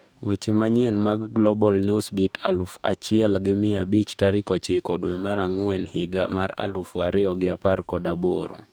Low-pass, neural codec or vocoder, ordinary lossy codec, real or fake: none; codec, 44.1 kHz, 2.6 kbps, DAC; none; fake